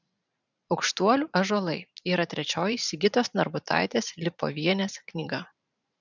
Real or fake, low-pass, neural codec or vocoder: fake; 7.2 kHz; vocoder, 44.1 kHz, 128 mel bands every 512 samples, BigVGAN v2